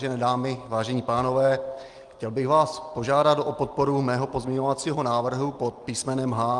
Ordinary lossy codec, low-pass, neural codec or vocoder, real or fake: Opus, 32 kbps; 10.8 kHz; none; real